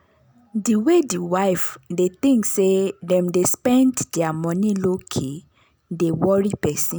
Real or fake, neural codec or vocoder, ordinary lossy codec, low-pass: real; none; none; none